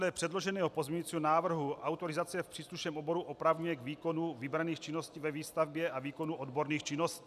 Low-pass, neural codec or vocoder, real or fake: 14.4 kHz; none; real